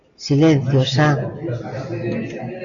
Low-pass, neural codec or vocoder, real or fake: 7.2 kHz; none; real